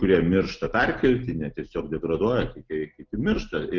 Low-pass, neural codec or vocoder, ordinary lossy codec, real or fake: 7.2 kHz; none; Opus, 24 kbps; real